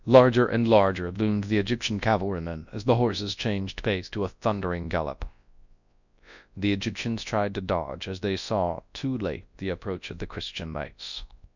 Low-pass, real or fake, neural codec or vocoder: 7.2 kHz; fake; codec, 24 kHz, 0.9 kbps, WavTokenizer, large speech release